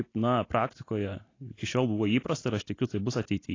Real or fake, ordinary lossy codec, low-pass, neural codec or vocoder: real; AAC, 32 kbps; 7.2 kHz; none